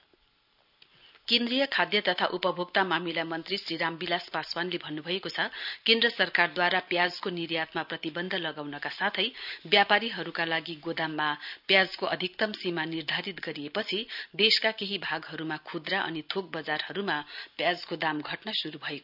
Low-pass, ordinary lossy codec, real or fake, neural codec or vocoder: 5.4 kHz; none; real; none